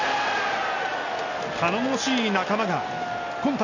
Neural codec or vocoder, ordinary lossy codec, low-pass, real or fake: none; none; 7.2 kHz; real